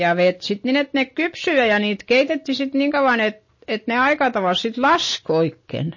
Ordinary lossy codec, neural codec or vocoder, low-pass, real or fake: MP3, 32 kbps; none; 7.2 kHz; real